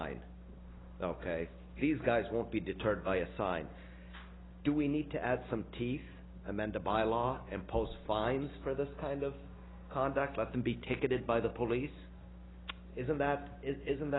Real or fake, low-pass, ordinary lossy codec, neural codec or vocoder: real; 7.2 kHz; AAC, 16 kbps; none